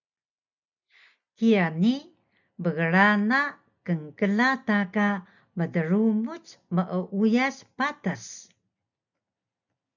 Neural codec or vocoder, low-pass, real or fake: none; 7.2 kHz; real